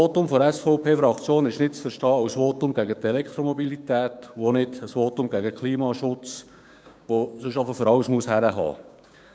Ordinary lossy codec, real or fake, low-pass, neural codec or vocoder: none; fake; none; codec, 16 kHz, 6 kbps, DAC